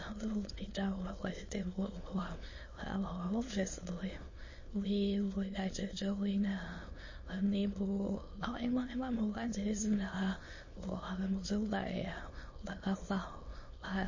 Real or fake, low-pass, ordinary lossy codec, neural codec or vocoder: fake; 7.2 kHz; MP3, 32 kbps; autoencoder, 22.05 kHz, a latent of 192 numbers a frame, VITS, trained on many speakers